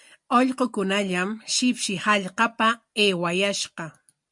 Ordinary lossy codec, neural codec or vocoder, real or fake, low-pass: MP3, 64 kbps; none; real; 10.8 kHz